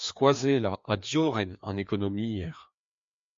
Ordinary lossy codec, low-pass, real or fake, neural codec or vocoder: MP3, 48 kbps; 7.2 kHz; fake; codec, 16 kHz, 2 kbps, FreqCodec, larger model